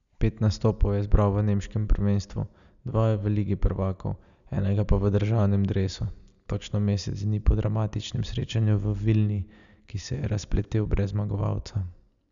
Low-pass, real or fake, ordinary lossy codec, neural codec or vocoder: 7.2 kHz; real; none; none